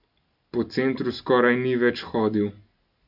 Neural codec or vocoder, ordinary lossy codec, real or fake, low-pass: none; none; real; 5.4 kHz